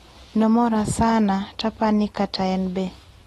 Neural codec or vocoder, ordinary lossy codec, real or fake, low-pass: none; AAC, 32 kbps; real; 19.8 kHz